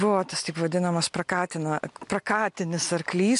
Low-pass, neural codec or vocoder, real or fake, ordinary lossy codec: 10.8 kHz; none; real; MP3, 64 kbps